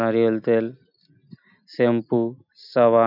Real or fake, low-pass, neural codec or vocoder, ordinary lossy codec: real; 5.4 kHz; none; none